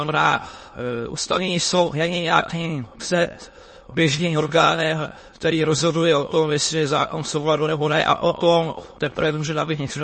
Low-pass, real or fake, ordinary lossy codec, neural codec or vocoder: 9.9 kHz; fake; MP3, 32 kbps; autoencoder, 22.05 kHz, a latent of 192 numbers a frame, VITS, trained on many speakers